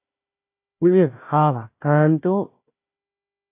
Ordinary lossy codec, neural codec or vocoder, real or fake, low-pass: AAC, 24 kbps; codec, 16 kHz, 1 kbps, FunCodec, trained on Chinese and English, 50 frames a second; fake; 3.6 kHz